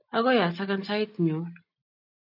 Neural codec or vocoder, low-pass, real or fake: none; 5.4 kHz; real